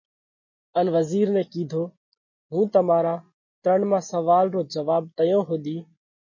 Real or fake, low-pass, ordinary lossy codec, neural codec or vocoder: real; 7.2 kHz; MP3, 32 kbps; none